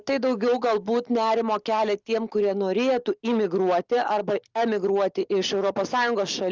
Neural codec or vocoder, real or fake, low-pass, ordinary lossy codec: none; real; 7.2 kHz; Opus, 32 kbps